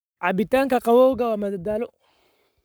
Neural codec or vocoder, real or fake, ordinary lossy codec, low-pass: codec, 44.1 kHz, 7.8 kbps, Pupu-Codec; fake; none; none